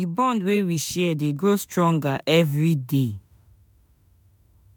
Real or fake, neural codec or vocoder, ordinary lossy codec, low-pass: fake; autoencoder, 48 kHz, 32 numbers a frame, DAC-VAE, trained on Japanese speech; none; none